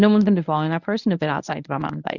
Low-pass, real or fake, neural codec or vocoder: 7.2 kHz; fake; codec, 24 kHz, 0.9 kbps, WavTokenizer, medium speech release version 1